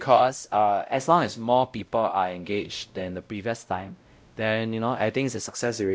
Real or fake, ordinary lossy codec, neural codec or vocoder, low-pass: fake; none; codec, 16 kHz, 0.5 kbps, X-Codec, WavLM features, trained on Multilingual LibriSpeech; none